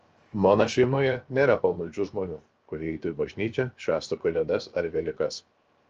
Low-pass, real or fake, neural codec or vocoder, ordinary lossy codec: 7.2 kHz; fake; codec, 16 kHz, 0.7 kbps, FocalCodec; Opus, 24 kbps